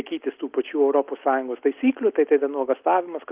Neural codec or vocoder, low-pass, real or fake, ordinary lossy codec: none; 3.6 kHz; real; Opus, 32 kbps